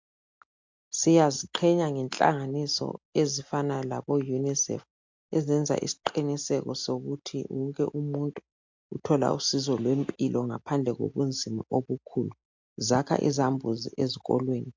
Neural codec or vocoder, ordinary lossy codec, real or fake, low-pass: none; MP3, 64 kbps; real; 7.2 kHz